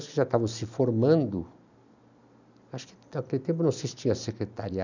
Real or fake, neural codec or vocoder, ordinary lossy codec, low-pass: real; none; none; 7.2 kHz